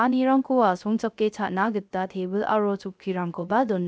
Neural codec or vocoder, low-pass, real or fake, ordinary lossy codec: codec, 16 kHz, 0.3 kbps, FocalCodec; none; fake; none